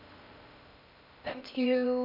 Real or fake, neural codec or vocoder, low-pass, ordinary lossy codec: fake; codec, 16 kHz in and 24 kHz out, 0.6 kbps, FocalCodec, streaming, 4096 codes; 5.4 kHz; MP3, 48 kbps